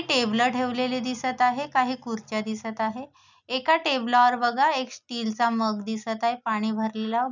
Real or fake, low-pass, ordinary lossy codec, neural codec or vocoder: real; 7.2 kHz; none; none